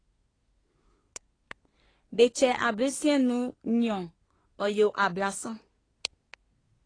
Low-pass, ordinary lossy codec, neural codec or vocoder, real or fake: 9.9 kHz; AAC, 32 kbps; codec, 24 kHz, 1 kbps, SNAC; fake